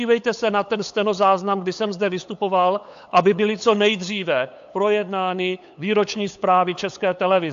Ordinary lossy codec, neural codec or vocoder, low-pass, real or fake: AAC, 64 kbps; codec, 16 kHz, 8 kbps, FunCodec, trained on LibriTTS, 25 frames a second; 7.2 kHz; fake